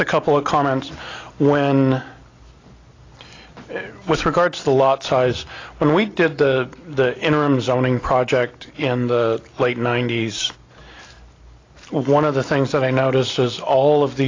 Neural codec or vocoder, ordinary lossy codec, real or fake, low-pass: none; AAC, 32 kbps; real; 7.2 kHz